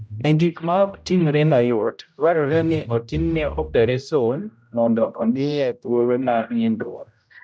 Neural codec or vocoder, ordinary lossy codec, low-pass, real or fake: codec, 16 kHz, 0.5 kbps, X-Codec, HuBERT features, trained on general audio; none; none; fake